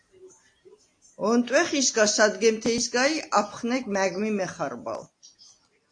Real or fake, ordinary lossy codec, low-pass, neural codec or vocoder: real; AAC, 48 kbps; 9.9 kHz; none